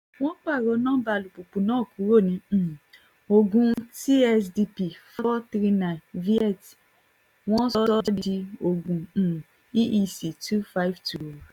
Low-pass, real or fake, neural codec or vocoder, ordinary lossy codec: 19.8 kHz; real; none; none